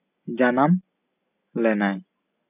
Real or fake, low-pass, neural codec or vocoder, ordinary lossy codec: real; 3.6 kHz; none; none